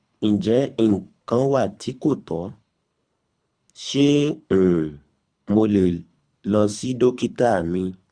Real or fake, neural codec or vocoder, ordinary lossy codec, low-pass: fake; codec, 24 kHz, 3 kbps, HILCodec; Opus, 64 kbps; 9.9 kHz